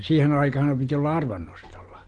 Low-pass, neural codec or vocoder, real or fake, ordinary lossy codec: 9.9 kHz; none; real; Opus, 16 kbps